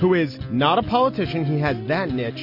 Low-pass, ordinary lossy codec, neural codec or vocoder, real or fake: 5.4 kHz; MP3, 32 kbps; none; real